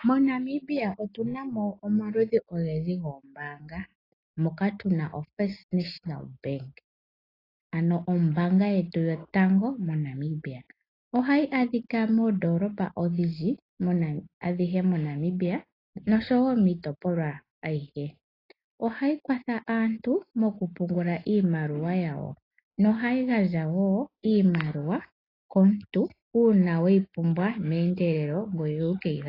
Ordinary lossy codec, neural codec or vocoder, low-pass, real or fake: AAC, 24 kbps; none; 5.4 kHz; real